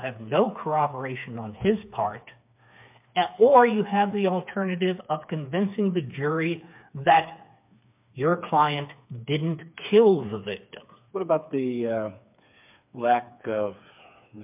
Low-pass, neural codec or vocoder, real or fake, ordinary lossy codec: 3.6 kHz; codec, 16 kHz, 4 kbps, FreqCodec, smaller model; fake; MP3, 32 kbps